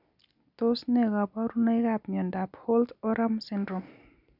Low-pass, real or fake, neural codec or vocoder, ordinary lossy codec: 5.4 kHz; real; none; none